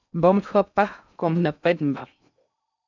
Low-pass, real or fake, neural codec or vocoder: 7.2 kHz; fake; codec, 16 kHz in and 24 kHz out, 0.6 kbps, FocalCodec, streaming, 2048 codes